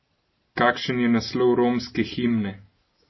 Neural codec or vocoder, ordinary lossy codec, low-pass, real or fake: none; MP3, 24 kbps; 7.2 kHz; real